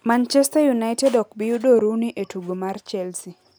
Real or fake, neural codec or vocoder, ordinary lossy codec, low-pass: real; none; none; none